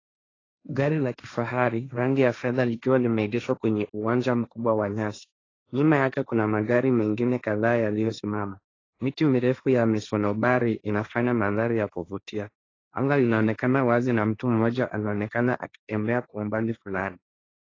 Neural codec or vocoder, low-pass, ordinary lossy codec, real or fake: codec, 16 kHz, 1.1 kbps, Voila-Tokenizer; 7.2 kHz; AAC, 32 kbps; fake